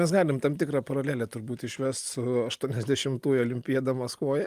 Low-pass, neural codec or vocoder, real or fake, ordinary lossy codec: 14.4 kHz; none; real; Opus, 32 kbps